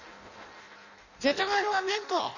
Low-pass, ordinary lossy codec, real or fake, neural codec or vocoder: 7.2 kHz; none; fake; codec, 16 kHz in and 24 kHz out, 0.6 kbps, FireRedTTS-2 codec